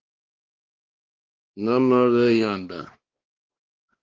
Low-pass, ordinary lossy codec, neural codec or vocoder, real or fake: 7.2 kHz; Opus, 16 kbps; codec, 16 kHz, 2 kbps, X-Codec, WavLM features, trained on Multilingual LibriSpeech; fake